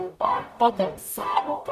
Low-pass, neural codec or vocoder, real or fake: 14.4 kHz; codec, 44.1 kHz, 0.9 kbps, DAC; fake